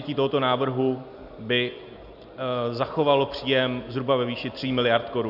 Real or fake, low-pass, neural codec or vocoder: real; 5.4 kHz; none